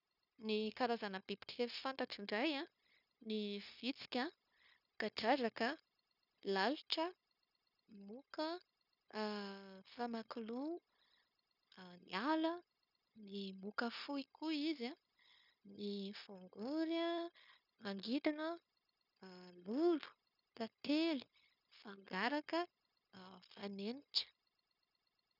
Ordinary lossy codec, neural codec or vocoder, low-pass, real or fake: none; codec, 16 kHz, 0.9 kbps, LongCat-Audio-Codec; 5.4 kHz; fake